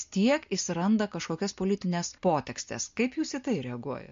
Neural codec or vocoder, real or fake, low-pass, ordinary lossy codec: none; real; 7.2 kHz; MP3, 48 kbps